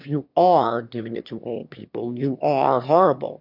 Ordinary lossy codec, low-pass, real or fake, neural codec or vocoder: MP3, 48 kbps; 5.4 kHz; fake; autoencoder, 22.05 kHz, a latent of 192 numbers a frame, VITS, trained on one speaker